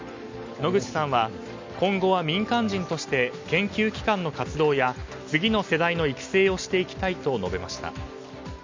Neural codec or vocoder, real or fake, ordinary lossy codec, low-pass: none; real; MP3, 64 kbps; 7.2 kHz